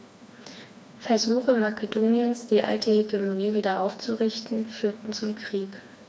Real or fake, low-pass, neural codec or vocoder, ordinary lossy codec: fake; none; codec, 16 kHz, 2 kbps, FreqCodec, smaller model; none